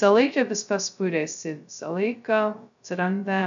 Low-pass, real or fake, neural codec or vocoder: 7.2 kHz; fake; codec, 16 kHz, 0.2 kbps, FocalCodec